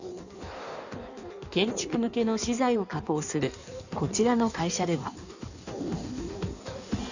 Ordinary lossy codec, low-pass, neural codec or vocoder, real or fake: none; 7.2 kHz; codec, 16 kHz in and 24 kHz out, 1.1 kbps, FireRedTTS-2 codec; fake